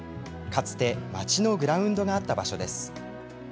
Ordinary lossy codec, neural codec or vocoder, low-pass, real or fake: none; none; none; real